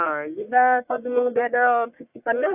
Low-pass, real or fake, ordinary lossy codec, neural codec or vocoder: 3.6 kHz; fake; AAC, 32 kbps; codec, 44.1 kHz, 1.7 kbps, Pupu-Codec